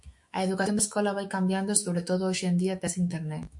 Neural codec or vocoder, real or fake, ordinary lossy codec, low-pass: autoencoder, 48 kHz, 128 numbers a frame, DAC-VAE, trained on Japanese speech; fake; MP3, 64 kbps; 10.8 kHz